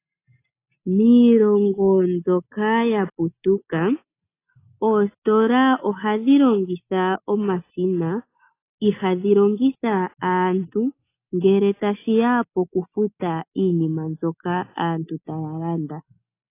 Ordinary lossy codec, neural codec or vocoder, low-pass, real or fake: AAC, 24 kbps; none; 3.6 kHz; real